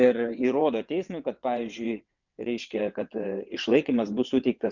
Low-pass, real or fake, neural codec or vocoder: 7.2 kHz; fake; vocoder, 22.05 kHz, 80 mel bands, WaveNeXt